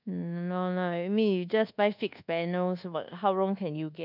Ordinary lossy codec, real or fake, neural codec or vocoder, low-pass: none; fake; codec, 24 kHz, 1.2 kbps, DualCodec; 5.4 kHz